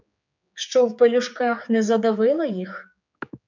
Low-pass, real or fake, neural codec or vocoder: 7.2 kHz; fake; codec, 16 kHz, 4 kbps, X-Codec, HuBERT features, trained on general audio